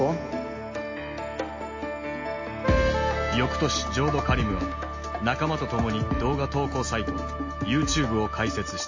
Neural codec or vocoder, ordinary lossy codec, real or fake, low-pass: none; MP3, 32 kbps; real; 7.2 kHz